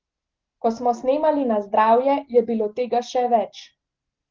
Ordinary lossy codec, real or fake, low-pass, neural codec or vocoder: Opus, 16 kbps; real; 7.2 kHz; none